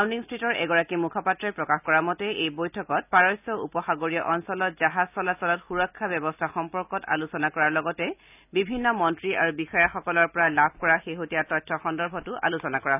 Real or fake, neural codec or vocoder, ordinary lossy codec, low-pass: real; none; none; 3.6 kHz